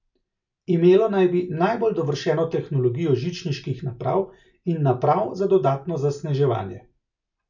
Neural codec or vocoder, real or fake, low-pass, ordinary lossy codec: none; real; 7.2 kHz; none